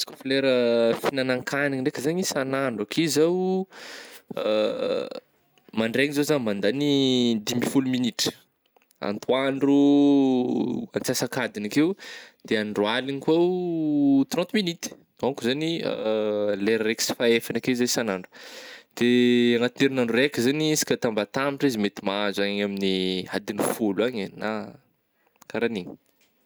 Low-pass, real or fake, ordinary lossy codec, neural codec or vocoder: none; real; none; none